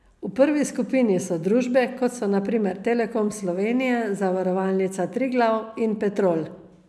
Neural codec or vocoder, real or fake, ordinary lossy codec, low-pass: none; real; none; none